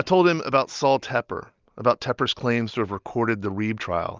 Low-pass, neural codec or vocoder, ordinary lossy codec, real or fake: 7.2 kHz; none; Opus, 32 kbps; real